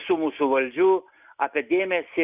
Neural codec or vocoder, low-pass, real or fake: none; 3.6 kHz; real